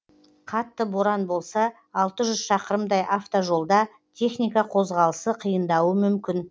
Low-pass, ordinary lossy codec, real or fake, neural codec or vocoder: none; none; real; none